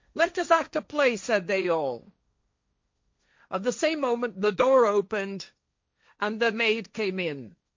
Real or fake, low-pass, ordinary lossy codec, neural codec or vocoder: fake; 7.2 kHz; MP3, 48 kbps; codec, 16 kHz, 1.1 kbps, Voila-Tokenizer